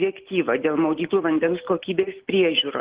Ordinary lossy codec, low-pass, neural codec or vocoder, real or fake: Opus, 16 kbps; 3.6 kHz; none; real